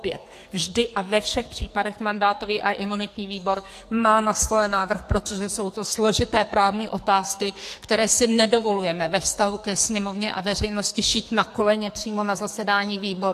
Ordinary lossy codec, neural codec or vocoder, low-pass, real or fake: AAC, 64 kbps; codec, 44.1 kHz, 2.6 kbps, SNAC; 14.4 kHz; fake